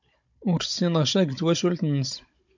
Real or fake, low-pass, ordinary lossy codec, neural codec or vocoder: fake; 7.2 kHz; MP3, 48 kbps; codec, 16 kHz, 16 kbps, FunCodec, trained on Chinese and English, 50 frames a second